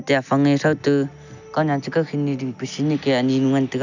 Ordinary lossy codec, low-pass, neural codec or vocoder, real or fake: none; 7.2 kHz; none; real